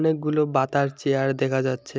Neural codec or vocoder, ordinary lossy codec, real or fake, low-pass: none; none; real; none